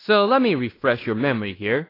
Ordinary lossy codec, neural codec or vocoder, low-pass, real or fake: AAC, 24 kbps; codec, 16 kHz in and 24 kHz out, 0.9 kbps, LongCat-Audio-Codec, fine tuned four codebook decoder; 5.4 kHz; fake